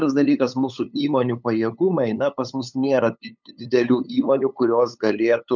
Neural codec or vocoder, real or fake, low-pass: codec, 16 kHz, 8 kbps, FunCodec, trained on LibriTTS, 25 frames a second; fake; 7.2 kHz